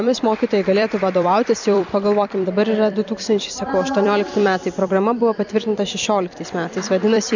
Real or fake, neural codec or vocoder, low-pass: fake; vocoder, 44.1 kHz, 128 mel bands every 256 samples, BigVGAN v2; 7.2 kHz